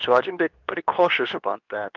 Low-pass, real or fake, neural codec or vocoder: 7.2 kHz; fake; codec, 24 kHz, 0.9 kbps, WavTokenizer, medium speech release version 2